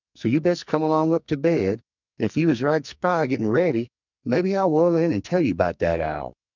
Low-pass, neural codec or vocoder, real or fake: 7.2 kHz; codec, 44.1 kHz, 2.6 kbps, SNAC; fake